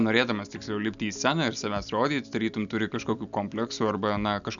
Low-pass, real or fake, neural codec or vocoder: 7.2 kHz; real; none